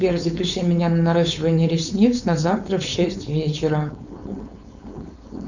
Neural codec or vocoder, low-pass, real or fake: codec, 16 kHz, 4.8 kbps, FACodec; 7.2 kHz; fake